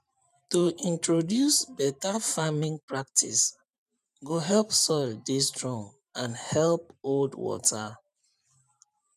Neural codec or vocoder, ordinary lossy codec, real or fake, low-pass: vocoder, 44.1 kHz, 128 mel bands every 512 samples, BigVGAN v2; none; fake; 14.4 kHz